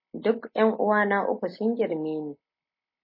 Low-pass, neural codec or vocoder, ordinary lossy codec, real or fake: 5.4 kHz; none; MP3, 24 kbps; real